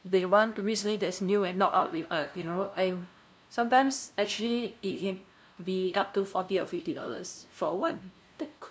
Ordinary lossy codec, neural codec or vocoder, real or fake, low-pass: none; codec, 16 kHz, 0.5 kbps, FunCodec, trained on LibriTTS, 25 frames a second; fake; none